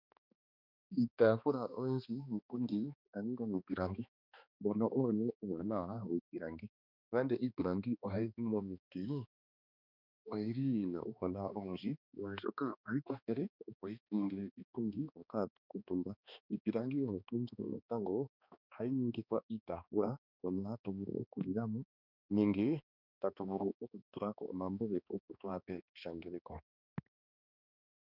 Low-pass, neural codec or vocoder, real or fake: 5.4 kHz; codec, 16 kHz, 2 kbps, X-Codec, HuBERT features, trained on balanced general audio; fake